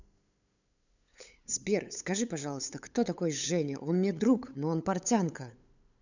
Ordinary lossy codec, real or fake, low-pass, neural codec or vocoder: none; fake; 7.2 kHz; codec, 16 kHz, 8 kbps, FunCodec, trained on LibriTTS, 25 frames a second